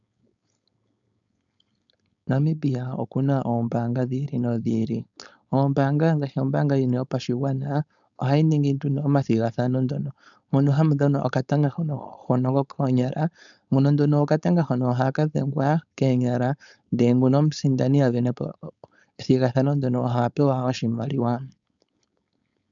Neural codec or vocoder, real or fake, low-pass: codec, 16 kHz, 4.8 kbps, FACodec; fake; 7.2 kHz